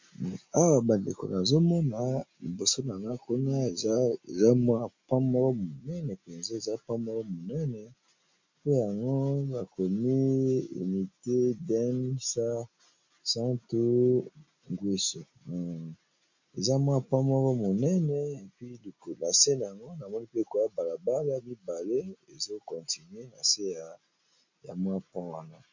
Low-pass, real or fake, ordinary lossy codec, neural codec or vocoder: 7.2 kHz; real; MP3, 48 kbps; none